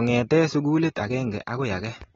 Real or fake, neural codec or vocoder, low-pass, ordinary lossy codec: real; none; 7.2 kHz; AAC, 24 kbps